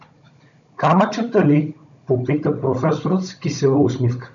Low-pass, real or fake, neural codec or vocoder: 7.2 kHz; fake; codec, 16 kHz, 16 kbps, FunCodec, trained on Chinese and English, 50 frames a second